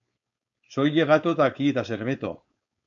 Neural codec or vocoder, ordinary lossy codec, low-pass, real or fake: codec, 16 kHz, 4.8 kbps, FACodec; AAC, 64 kbps; 7.2 kHz; fake